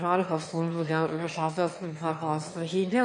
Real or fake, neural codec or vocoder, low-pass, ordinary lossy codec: fake; autoencoder, 22.05 kHz, a latent of 192 numbers a frame, VITS, trained on one speaker; 9.9 kHz; MP3, 48 kbps